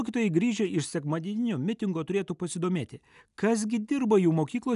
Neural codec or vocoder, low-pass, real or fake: none; 10.8 kHz; real